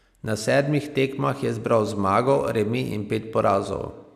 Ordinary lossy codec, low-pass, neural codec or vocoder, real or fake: none; 14.4 kHz; none; real